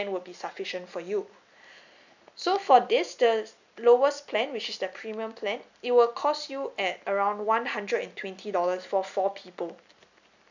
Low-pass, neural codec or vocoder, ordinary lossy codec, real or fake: 7.2 kHz; none; none; real